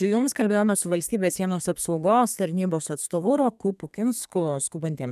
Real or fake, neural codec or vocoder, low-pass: fake; codec, 32 kHz, 1.9 kbps, SNAC; 14.4 kHz